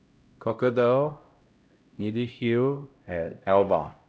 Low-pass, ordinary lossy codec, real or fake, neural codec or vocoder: none; none; fake; codec, 16 kHz, 0.5 kbps, X-Codec, HuBERT features, trained on LibriSpeech